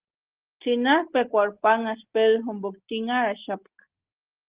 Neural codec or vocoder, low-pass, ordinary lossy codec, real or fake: none; 3.6 kHz; Opus, 16 kbps; real